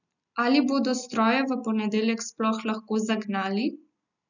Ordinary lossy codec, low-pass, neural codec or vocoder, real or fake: none; 7.2 kHz; none; real